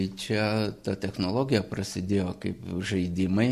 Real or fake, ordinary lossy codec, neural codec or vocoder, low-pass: real; MP3, 96 kbps; none; 14.4 kHz